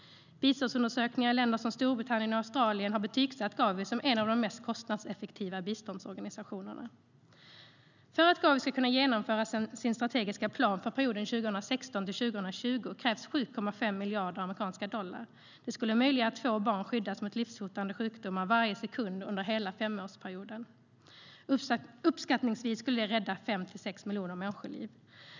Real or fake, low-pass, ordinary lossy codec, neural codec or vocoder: real; 7.2 kHz; none; none